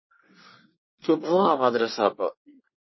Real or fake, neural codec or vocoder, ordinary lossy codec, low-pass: fake; codec, 24 kHz, 1 kbps, SNAC; MP3, 24 kbps; 7.2 kHz